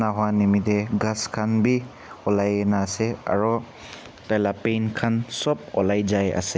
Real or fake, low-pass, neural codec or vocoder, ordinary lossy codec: real; none; none; none